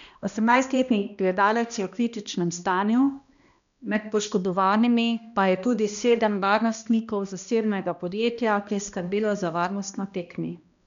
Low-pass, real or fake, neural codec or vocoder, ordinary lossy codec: 7.2 kHz; fake; codec, 16 kHz, 1 kbps, X-Codec, HuBERT features, trained on balanced general audio; none